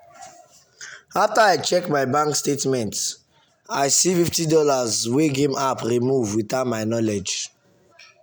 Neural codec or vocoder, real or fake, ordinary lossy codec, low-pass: none; real; none; none